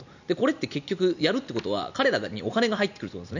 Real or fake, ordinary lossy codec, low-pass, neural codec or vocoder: real; none; 7.2 kHz; none